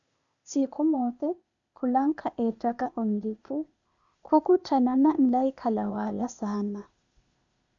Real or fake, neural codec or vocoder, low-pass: fake; codec, 16 kHz, 0.8 kbps, ZipCodec; 7.2 kHz